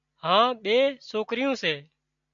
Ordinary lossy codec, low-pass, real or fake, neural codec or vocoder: MP3, 96 kbps; 7.2 kHz; real; none